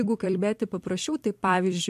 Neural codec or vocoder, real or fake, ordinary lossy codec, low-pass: vocoder, 44.1 kHz, 128 mel bands every 256 samples, BigVGAN v2; fake; MP3, 64 kbps; 14.4 kHz